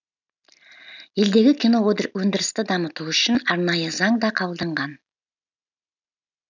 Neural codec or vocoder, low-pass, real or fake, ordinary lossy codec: none; 7.2 kHz; real; none